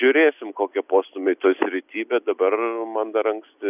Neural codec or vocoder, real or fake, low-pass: none; real; 3.6 kHz